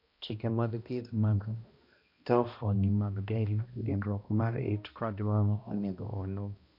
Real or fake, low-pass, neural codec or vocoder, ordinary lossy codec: fake; 5.4 kHz; codec, 16 kHz, 1 kbps, X-Codec, HuBERT features, trained on balanced general audio; MP3, 48 kbps